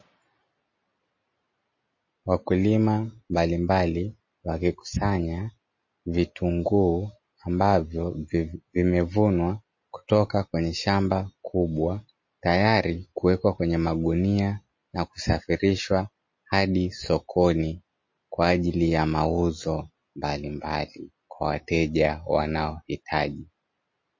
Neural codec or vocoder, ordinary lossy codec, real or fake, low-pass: none; MP3, 32 kbps; real; 7.2 kHz